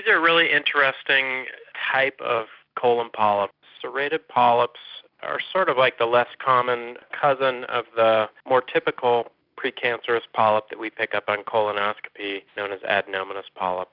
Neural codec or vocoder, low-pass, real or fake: none; 5.4 kHz; real